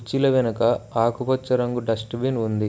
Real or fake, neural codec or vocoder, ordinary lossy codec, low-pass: real; none; none; none